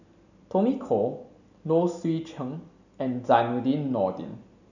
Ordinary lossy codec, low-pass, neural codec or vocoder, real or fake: none; 7.2 kHz; none; real